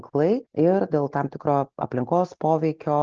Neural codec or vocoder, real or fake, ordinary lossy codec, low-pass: none; real; Opus, 16 kbps; 7.2 kHz